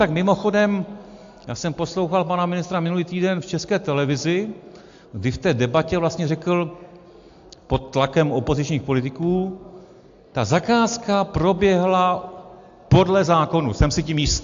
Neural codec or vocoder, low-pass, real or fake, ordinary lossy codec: none; 7.2 kHz; real; AAC, 64 kbps